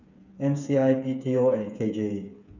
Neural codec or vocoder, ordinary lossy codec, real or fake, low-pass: codec, 16 kHz, 8 kbps, FreqCodec, smaller model; none; fake; 7.2 kHz